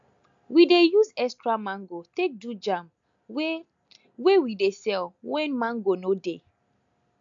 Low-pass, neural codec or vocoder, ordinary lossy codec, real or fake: 7.2 kHz; none; AAC, 64 kbps; real